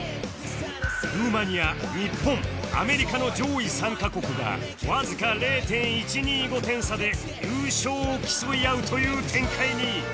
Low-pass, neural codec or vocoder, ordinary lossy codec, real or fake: none; none; none; real